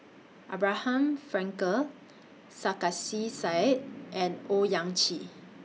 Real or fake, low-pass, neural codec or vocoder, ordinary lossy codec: real; none; none; none